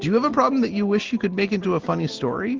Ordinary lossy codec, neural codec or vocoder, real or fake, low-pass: Opus, 16 kbps; none; real; 7.2 kHz